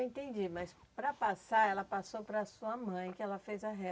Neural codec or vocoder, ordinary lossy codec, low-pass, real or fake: none; none; none; real